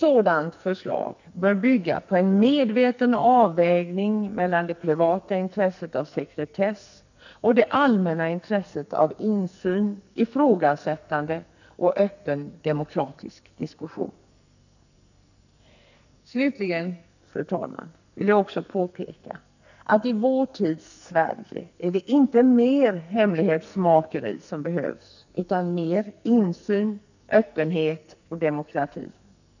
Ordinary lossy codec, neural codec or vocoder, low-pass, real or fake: none; codec, 44.1 kHz, 2.6 kbps, SNAC; 7.2 kHz; fake